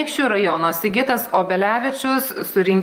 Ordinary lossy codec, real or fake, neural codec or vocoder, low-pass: Opus, 32 kbps; fake; vocoder, 44.1 kHz, 128 mel bands, Pupu-Vocoder; 19.8 kHz